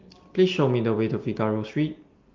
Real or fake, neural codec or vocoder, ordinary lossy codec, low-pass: real; none; Opus, 32 kbps; 7.2 kHz